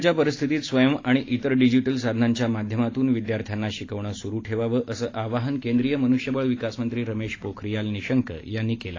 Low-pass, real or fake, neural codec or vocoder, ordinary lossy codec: 7.2 kHz; real; none; AAC, 32 kbps